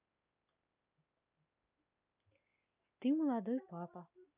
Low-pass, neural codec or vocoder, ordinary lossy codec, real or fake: 3.6 kHz; none; none; real